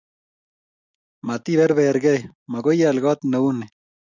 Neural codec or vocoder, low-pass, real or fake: none; 7.2 kHz; real